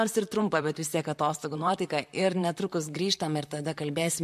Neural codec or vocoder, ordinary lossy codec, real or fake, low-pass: vocoder, 44.1 kHz, 128 mel bands, Pupu-Vocoder; MP3, 64 kbps; fake; 14.4 kHz